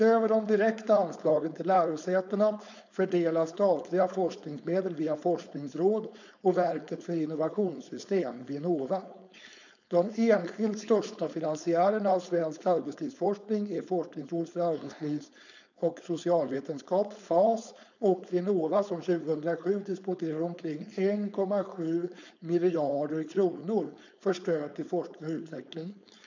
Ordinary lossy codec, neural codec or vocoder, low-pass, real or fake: none; codec, 16 kHz, 4.8 kbps, FACodec; 7.2 kHz; fake